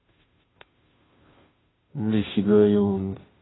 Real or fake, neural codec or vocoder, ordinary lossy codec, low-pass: fake; codec, 16 kHz, 0.5 kbps, FunCodec, trained on Chinese and English, 25 frames a second; AAC, 16 kbps; 7.2 kHz